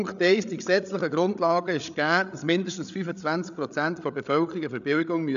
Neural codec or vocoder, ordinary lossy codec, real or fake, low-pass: codec, 16 kHz, 8 kbps, FreqCodec, larger model; none; fake; 7.2 kHz